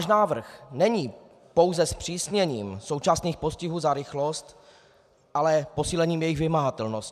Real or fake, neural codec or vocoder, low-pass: real; none; 14.4 kHz